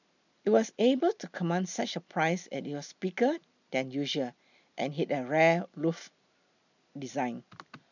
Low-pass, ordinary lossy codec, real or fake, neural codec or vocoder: 7.2 kHz; none; real; none